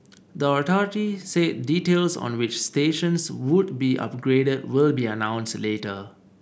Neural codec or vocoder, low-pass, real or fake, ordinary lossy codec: none; none; real; none